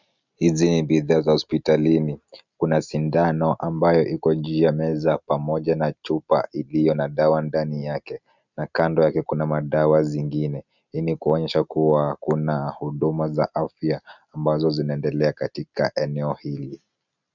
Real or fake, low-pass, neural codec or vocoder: real; 7.2 kHz; none